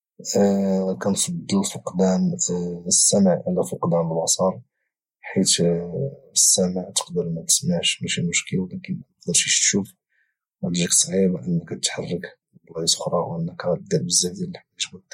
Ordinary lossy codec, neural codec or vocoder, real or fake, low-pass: MP3, 64 kbps; none; real; 19.8 kHz